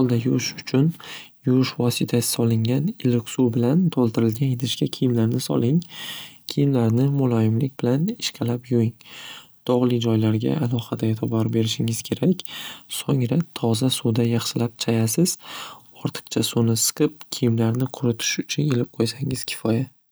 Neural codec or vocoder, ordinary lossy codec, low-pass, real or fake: none; none; none; real